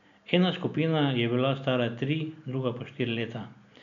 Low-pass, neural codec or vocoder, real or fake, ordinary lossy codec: 7.2 kHz; none; real; none